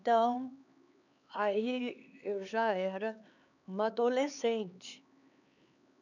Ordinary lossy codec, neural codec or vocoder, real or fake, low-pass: none; codec, 16 kHz, 4 kbps, X-Codec, HuBERT features, trained on LibriSpeech; fake; 7.2 kHz